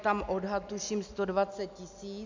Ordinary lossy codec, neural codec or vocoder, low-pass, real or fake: MP3, 64 kbps; none; 7.2 kHz; real